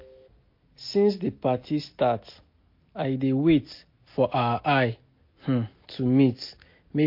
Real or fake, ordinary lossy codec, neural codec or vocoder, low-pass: real; MP3, 32 kbps; none; 5.4 kHz